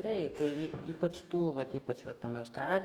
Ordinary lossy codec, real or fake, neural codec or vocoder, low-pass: Opus, 64 kbps; fake; codec, 44.1 kHz, 2.6 kbps, DAC; 19.8 kHz